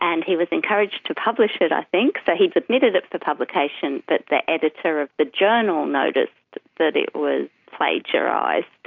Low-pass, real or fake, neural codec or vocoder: 7.2 kHz; real; none